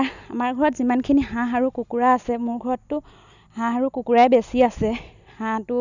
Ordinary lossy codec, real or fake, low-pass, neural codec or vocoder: none; real; 7.2 kHz; none